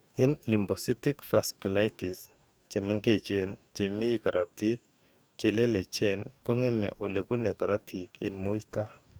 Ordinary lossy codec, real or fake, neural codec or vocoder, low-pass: none; fake; codec, 44.1 kHz, 2.6 kbps, DAC; none